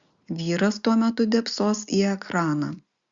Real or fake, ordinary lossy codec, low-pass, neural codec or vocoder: real; Opus, 64 kbps; 7.2 kHz; none